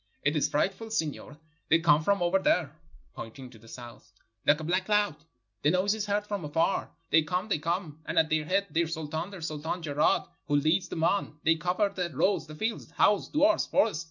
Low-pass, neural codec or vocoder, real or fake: 7.2 kHz; none; real